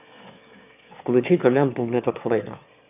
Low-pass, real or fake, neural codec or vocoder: 3.6 kHz; fake; autoencoder, 22.05 kHz, a latent of 192 numbers a frame, VITS, trained on one speaker